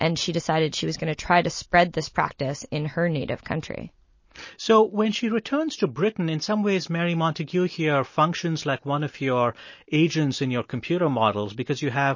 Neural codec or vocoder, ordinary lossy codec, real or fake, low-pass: none; MP3, 32 kbps; real; 7.2 kHz